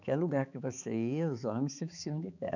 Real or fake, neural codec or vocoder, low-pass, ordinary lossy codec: fake; codec, 16 kHz, 4 kbps, X-Codec, HuBERT features, trained on balanced general audio; 7.2 kHz; none